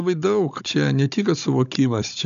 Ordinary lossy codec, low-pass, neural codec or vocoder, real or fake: MP3, 96 kbps; 7.2 kHz; codec, 16 kHz, 16 kbps, FunCodec, trained on Chinese and English, 50 frames a second; fake